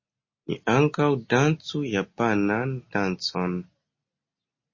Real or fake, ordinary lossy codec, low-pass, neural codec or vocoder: real; MP3, 32 kbps; 7.2 kHz; none